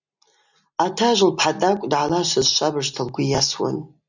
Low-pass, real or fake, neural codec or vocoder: 7.2 kHz; real; none